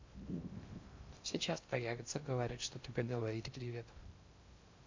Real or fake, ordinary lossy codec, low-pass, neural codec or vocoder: fake; MP3, 48 kbps; 7.2 kHz; codec, 16 kHz in and 24 kHz out, 0.6 kbps, FocalCodec, streaming, 2048 codes